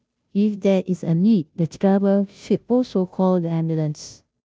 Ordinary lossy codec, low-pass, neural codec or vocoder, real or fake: none; none; codec, 16 kHz, 0.5 kbps, FunCodec, trained on Chinese and English, 25 frames a second; fake